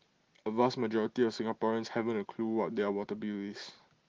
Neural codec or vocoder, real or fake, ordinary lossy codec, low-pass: none; real; Opus, 16 kbps; 7.2 kHz